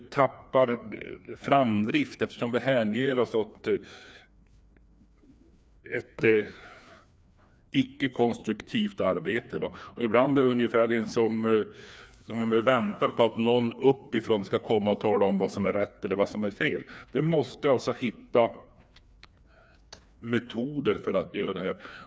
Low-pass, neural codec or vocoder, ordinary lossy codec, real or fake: none; codec, 16 kHz, 2 kbps, FreqCodec, larger model; none; fake